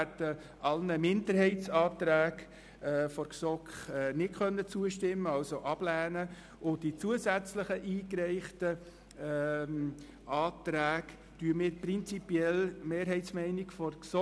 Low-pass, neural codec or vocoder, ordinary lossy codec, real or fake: none; none; none; real